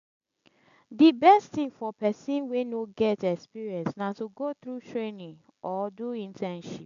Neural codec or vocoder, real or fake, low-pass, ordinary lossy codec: none; real; 7.2 kHz; AAC, 96 kbps